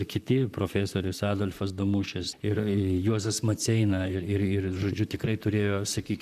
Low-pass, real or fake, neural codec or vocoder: 14.4 kHz; fake; vocoder, 44.1 kHz, 128 mel bands, Pupu-Vocoder